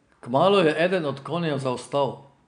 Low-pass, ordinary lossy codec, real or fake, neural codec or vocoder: 9.9 kHz; none; real; none